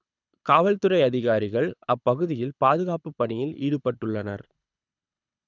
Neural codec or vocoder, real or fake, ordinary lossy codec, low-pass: codec, 24 kHz, 6 kbps, HILCodec; fake; none; 7.2 kHz